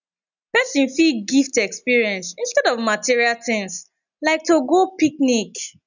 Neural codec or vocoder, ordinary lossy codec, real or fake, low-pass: none; none; real; 7.2 kHz